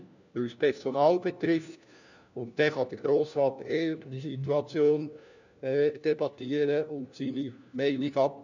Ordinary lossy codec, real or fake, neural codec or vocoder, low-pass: none; fake; codec, 16 kHz, 1 kbps, FunCodec, trained on LibriTTS, 50 frames a second; 7.2 kHz